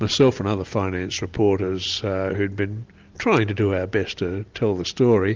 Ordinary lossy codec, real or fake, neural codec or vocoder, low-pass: Opus, 32 kbps; real; none; 7.2 kHz